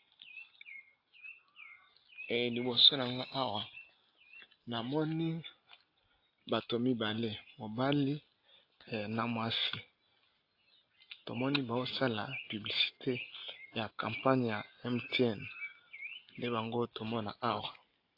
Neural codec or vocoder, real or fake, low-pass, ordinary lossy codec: none; real; 5.4 kHz; AAC, 32 kbps